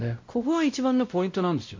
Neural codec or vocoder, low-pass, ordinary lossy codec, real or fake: codec, 16 kHz, 0.5 kbps, X-Codec, WavLM features, trained on Multilingual LibriSpeech; 7.2 kHz; MP3, 48 kbps; fake